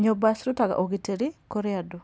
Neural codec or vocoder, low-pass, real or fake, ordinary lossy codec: none; none; real; none